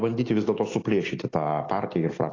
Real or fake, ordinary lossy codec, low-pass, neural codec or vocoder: real; AAC, 32 kbps; 7.2 kHz; none